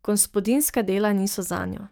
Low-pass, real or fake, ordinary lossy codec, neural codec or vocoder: none; fake; none; codec, 44.1 kHz, 7.8 kbps, DAC